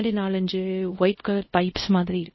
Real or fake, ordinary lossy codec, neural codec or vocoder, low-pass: fake; MP3, 24 kbps; codec, 24 kHz, 0.5 kbps, DualCodec; 7.2 kHz